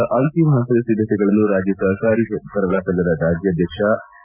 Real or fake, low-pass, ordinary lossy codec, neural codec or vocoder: real; 3.6 kHz; Opus, 64 kbps; none